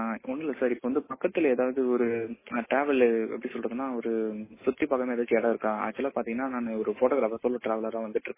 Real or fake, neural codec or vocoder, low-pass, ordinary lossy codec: real; none; 3.6 kHz; MP3, 16 kbps